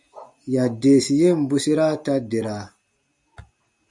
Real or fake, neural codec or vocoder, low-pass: real; none; 10.8 kHz